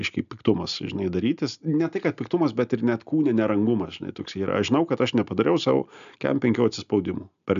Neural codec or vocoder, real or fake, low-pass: none; real; 7.2 kHz